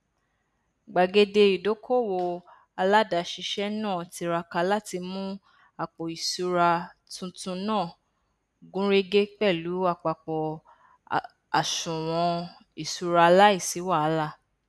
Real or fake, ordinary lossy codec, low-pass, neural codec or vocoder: real; none; none; none